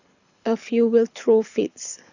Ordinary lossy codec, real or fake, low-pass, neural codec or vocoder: none; fake; 7.2 kHz; codec, 24 kHz, 6 kbps, HILCodec